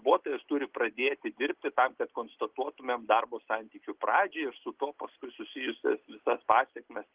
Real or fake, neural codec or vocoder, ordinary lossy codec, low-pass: real; none; Opus, 16 kbps; 3.6 kHz